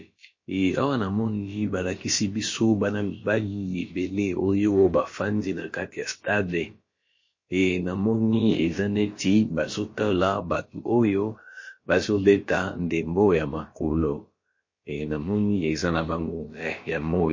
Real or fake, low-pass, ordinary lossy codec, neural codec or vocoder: fake; 7.2 kHz; MP3, 32 kbps; codec, 16 kHz, about 1 kbps, DyCAST, with the encoder's durations